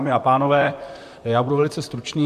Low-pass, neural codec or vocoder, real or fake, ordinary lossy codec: 14.4 kHz; vocoder, 44.1 kHz, 128 mel bands, Pupu-Vocoder; fake; AAC, 96 kbps